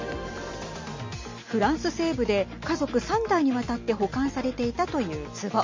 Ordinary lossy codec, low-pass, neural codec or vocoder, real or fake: MP3, 32 kbps; 7.2 kHz; none; real